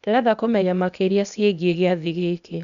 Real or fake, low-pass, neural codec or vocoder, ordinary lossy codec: fake; 7.2 kHz; codec, 16 kHz, 0.8 kbps, ZipCodec; none